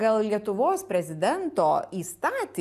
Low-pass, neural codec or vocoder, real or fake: 14.4 kHz; none; real